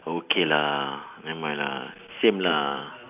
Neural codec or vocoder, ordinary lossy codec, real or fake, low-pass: none; AAC, 32 kbps; real; 3.6 kHz